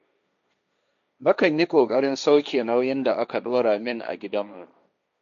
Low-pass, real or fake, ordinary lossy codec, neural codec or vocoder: 7.2 kHz; fake; none; codec, 16 kHz, 1.1 kbps, Voila-Tokenizer